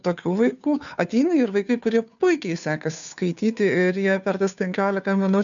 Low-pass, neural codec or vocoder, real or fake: 7.2 kHz; codec, 16 kHz, 2 kbps, FunCodec, trained on Chinese and English, 25 frames a second; fake